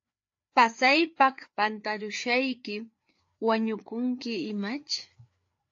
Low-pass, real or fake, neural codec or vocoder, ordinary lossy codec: 7.2 kHz; fake; codec, 16 kHz, 4 kbps, FreqCodec, larger model; AAC, 48 kbps